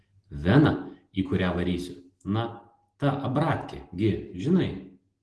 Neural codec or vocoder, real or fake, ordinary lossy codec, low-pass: none; real; Opus, 16 kbps; 10.8 kHz